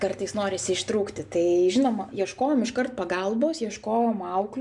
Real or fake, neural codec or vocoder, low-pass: real; none; 10.8 kHz